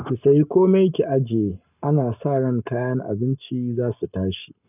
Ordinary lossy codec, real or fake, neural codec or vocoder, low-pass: none; fake; codec, 44.1 kHz, 7.8 kbps, Pupu-Codec; 3.6 kHz